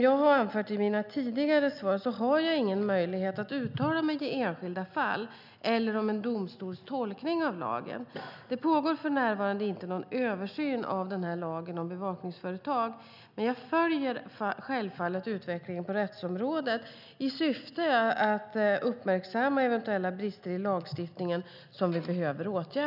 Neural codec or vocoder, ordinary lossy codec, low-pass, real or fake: none; none; 5.4 kHz; real